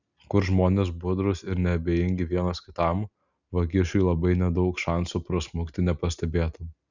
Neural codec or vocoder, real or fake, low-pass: none; real; 7.2 kHz